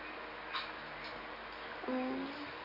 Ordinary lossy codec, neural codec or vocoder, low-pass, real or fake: none; none; 5.4 kHz; real